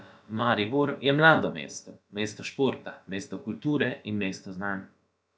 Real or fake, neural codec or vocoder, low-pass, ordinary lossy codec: fake; codec, 16 kHz, about 1 kbps, DyCAST, with the encoder's durations; none; none